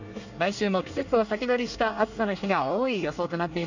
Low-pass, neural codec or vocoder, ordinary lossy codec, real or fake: 7.2 kHz; codec, 24 kHz, 1 kbps, SNAC; none; fake